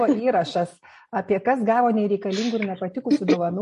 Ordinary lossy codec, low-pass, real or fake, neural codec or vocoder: MP3, 48 kbps; 14.4 kHz; real; none